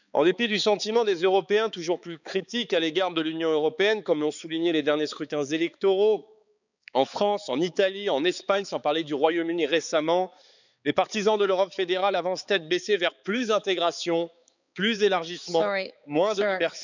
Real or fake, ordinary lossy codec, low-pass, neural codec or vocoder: fake; none; 7.2 kHz; codec, 16 kHz, 4 kbps, X-Codec, HuBERT features, trained on balanced general audio